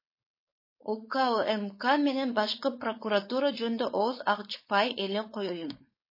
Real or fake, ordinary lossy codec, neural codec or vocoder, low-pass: fake; MP3, 24 kbps; codec, 16 kHz, 4.8 kbps, FACodec; 5.4 kHz